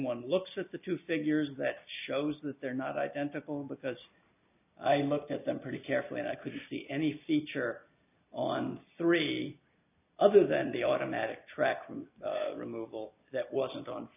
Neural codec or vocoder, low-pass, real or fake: none; 3.6 kHz; real